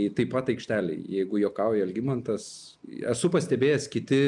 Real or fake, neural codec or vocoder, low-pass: real; none; 10.8 kHz